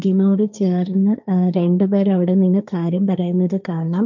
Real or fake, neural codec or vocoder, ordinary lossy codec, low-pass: fake; codec, 16 kHz, 1.1 kbps, Voila-Tokenizer; none; 7.2 kHz